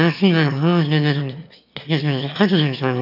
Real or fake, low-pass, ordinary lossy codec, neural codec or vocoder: fake; 5.4 kHz; none; autoencoder, 22.05 kHz, a latent of 192 numbers a frame, VITS, trained on one speaker